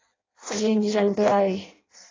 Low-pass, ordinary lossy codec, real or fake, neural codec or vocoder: 7.2 kHz; AAC, 32 kbps; fake; codec, 16 kHz in and 24 kHz out, 0.6 kbps, FireRedTTS-2 codec